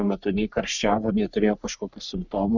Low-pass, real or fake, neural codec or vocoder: 7.2 kHz; fake; codec, 44.1 kHz, 3.4 kbps, Pupu-Codec